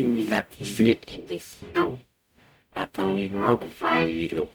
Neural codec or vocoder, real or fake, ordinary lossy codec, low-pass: codec, 44.1 kHz, 0.9 kbps, DAC; fake; none; none